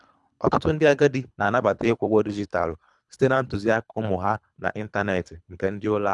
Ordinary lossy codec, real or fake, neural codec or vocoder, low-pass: none; fake; codec, 24 kHz, 3 kbps, HILCodec; 10.8 kHz